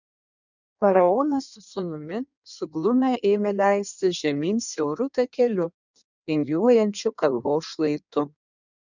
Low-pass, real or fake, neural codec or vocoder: 7.2 kHz; fake; codec, 16 kHz in and 24 kHz out, 1.1 kbps, FireRedTTS-2 codec